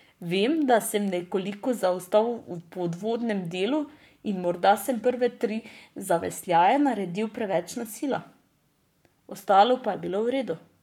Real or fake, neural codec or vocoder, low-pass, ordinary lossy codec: fake; codec, 44.1 kHz, 7.8 kbps, Pupu-Codec; 19.8 kHz; none